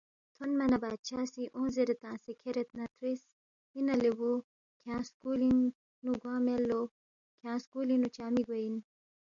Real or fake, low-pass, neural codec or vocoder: real; 7.2 kHz; none